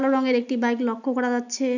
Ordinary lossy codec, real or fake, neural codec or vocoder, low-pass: none; real; none; 7.2 kHz